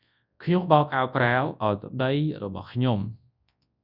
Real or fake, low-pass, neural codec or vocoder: fake; 5.4 kHz; codec, 24 kHz, 0.9 kbps, WavTokenizer, large speech release